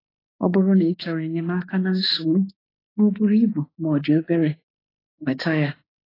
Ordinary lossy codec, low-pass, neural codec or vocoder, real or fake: AAC, 24 kbps; 5.4 kHz; autoencoder, 48 kHz, 32 numbers a frame, DAC-VAE, trained on Japanese speech; fake